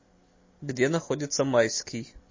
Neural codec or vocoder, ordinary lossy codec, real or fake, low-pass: none; MP3, 32 kbps; real; 7.2 kHz